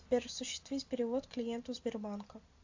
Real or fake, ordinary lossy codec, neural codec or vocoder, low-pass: real; AAC, 48 kbps; none; 7.2 kHz